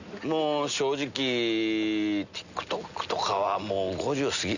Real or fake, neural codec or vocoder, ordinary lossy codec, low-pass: real; none; none; 7.2 kHz